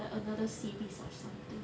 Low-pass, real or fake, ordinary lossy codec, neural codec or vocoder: none; real; none; none